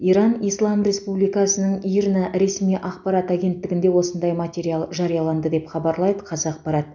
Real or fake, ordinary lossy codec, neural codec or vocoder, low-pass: real; none; none; 7.2 kHz